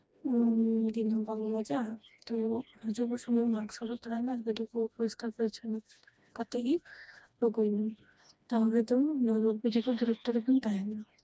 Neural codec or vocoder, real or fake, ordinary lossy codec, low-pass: codec, 16 kHz, 1 kbps, FreqCodec, smaller model; fake; none; none